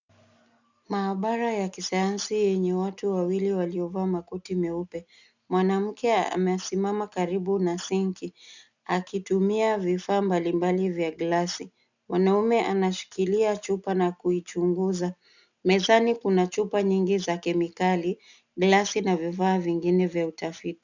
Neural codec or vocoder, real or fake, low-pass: none; real; 7.2 kHz